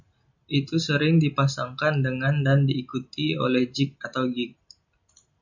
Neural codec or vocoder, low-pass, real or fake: none; 7.2 kHz; real